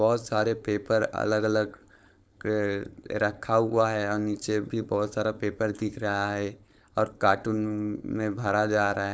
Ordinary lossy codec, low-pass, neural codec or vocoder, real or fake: none; none; codec, 16 kHz, 4.8 kbps, FACodec; fake